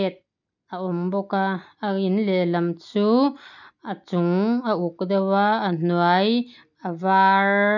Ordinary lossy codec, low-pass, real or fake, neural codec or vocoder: none; 7.2 kHz; real; none